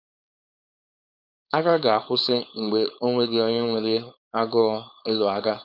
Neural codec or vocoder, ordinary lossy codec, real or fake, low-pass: codec, 16 kHz, 4.8 kbps, FACodec; none; fake; 5.4 kHz